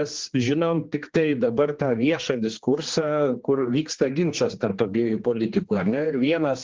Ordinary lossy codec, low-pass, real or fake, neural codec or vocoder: Opus, 16 kbps; 7.2 kHz; fake; codec, 16 kHz, 2 kbps, X-Codec, HuBERT features, trained on general audio